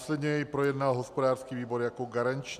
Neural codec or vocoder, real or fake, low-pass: none; real; 14.4 kHz